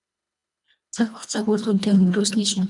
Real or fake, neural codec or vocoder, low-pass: fake; codec, 24 kHz, 1.5 kbps, HILCodec; 10.8 kHz